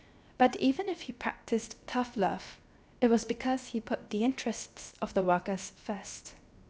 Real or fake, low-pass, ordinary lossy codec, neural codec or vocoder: fake; none; none; codec, 16 kHz, 0.7 kbps, FocalCodec